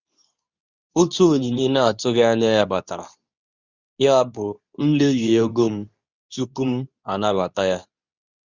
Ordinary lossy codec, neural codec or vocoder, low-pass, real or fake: Opus, 64 kbps; codec, 24 kHz, 0.9 kbps, WavTokenizer, medium speech release version 2; 7.2 kHz; fake